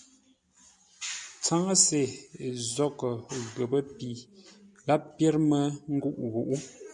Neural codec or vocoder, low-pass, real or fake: none; 10.8 kHz; real